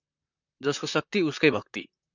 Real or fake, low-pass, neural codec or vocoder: fake; 7.2 kHz; vocoder, 44.1 kHz, 128 mel bands, Pupu-Vocoder